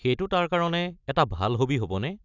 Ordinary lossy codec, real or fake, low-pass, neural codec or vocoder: none; real; 7.2 kHz; none